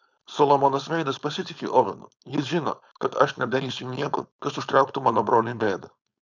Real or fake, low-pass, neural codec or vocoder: fake; 7.2 kHz; codec, 16 kHz, 4.8 kbps, FACodec